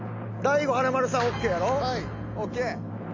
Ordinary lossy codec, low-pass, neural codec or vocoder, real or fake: MP3, 48 kbps; 7.2 kHz; none; real